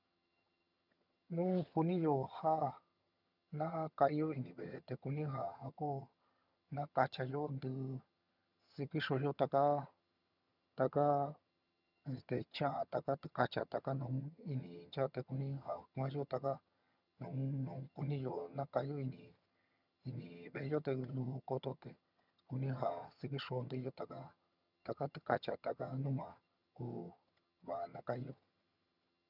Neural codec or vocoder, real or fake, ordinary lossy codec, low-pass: vocoder, 22.05 kHz, 80 mel bands, HiFi-GAN; fake; none; 5.4 kHz